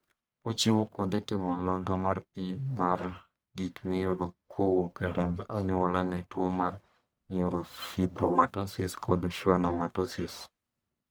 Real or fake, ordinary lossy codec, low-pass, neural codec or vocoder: fake; none; none; codec, 44.1 kHz, 1.7 kbps, Pupu-Codec